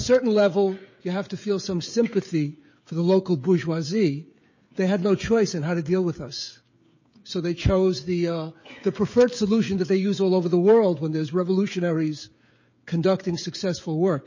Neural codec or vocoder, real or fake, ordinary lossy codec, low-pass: codec, 16 kHz, 16 kbps, FreqCodec, smaller model; fake; MP3, 32 kbps; 7.2 kHz